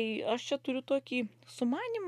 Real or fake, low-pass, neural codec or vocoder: real; 14.4 kHz; none